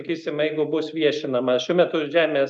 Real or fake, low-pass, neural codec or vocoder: real; 10.8 kHz; none